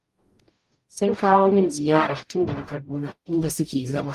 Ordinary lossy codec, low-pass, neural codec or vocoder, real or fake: Opus, 32 kbps; 14.4 kHz; codec, 44.1 kHz, 0.9 kbps, DAC; fake